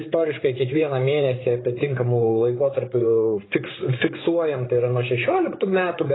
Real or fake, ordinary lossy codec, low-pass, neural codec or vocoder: fake; AAC, 16 kbps; 7.2 kHz; codec, 16 kHz, 8 kbps, FreqCodec, larger model